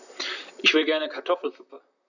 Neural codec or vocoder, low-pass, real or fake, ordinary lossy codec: vocoder, 44.1 kHz, 128 mel bands, Pupu-Vocoder; 7.2 kHz; fake; none